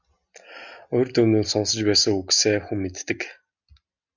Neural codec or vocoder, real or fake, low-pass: none; real; 7.2 kHz